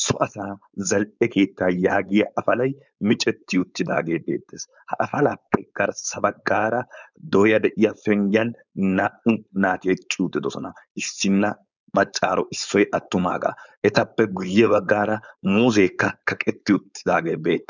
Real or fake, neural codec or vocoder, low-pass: fake; codec, 16 kHz, 4.8 kbps, FACodec; 7.2 kHz